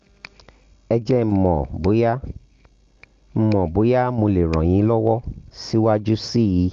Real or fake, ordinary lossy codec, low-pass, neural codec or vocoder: real; Opus, 32 kbps; 7.2 kHz; none